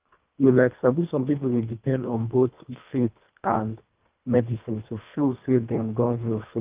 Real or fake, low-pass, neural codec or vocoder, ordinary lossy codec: fake; 3.6 kHz; codec, 24 kHz, 1.5 kbps, HILCodec; Opus, 24 kbps